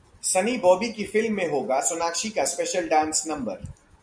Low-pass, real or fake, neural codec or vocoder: 9.9 kHz; real; none